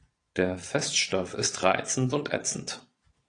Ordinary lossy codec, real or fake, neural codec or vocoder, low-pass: AAC, 48 kbps; fake; vocoder, 22.05 kHz, 80 mel bands, Vocos; 9.9 kHz